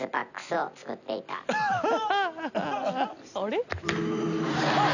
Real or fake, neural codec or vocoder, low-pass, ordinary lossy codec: real; none; 7.2 kHz; none